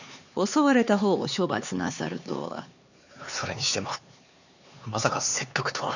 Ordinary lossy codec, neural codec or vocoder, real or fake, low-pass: none; codec, 16 kHz, 4 kbps, X-Codec, HuBERT features, trained on LibriSpeech; fake; 7.2 kHz